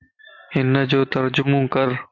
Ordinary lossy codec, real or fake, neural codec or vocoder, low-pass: MP3, 48 kbps; real; none; 7.2 kHz